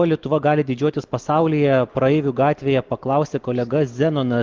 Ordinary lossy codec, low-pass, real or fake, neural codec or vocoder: Opus, 16 kbps; 7.2 kHz; real; none